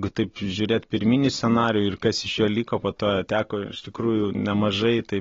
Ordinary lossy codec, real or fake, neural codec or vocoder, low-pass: AAC, 24 kbps; real; none; 7.2 kHz